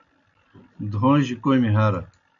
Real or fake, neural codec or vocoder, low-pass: real; none; 7.2 kHz